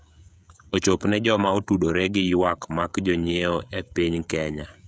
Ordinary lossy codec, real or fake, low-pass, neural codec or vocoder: none; fake; none; codec, 16 kHz, 16 kbps, FreqCodec, smaller model